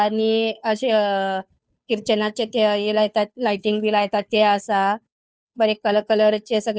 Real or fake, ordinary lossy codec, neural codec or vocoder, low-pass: fake; none; codec, 16 kHz, 2 kbps, FunCodec, trained on Chinese and English, 25 frames a second; none